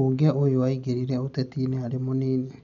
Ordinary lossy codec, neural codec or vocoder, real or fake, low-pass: none; none; real; 7.2 kHz